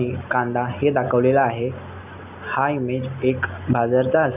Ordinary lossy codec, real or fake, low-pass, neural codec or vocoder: none; real; 3.6 kHz; none